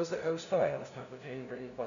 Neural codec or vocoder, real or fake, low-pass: codec, 16 kHz, 0.5 kbps, FunCodec, trained on LibriTTS, 25 frames a second; fake; 7.2 kHz